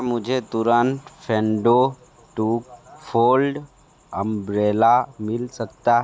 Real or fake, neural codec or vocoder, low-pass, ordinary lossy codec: real; none; none; none